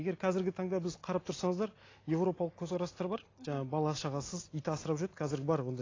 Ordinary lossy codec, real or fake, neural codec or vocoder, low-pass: AAC, 32 kbps; real; none; 7.2 kHz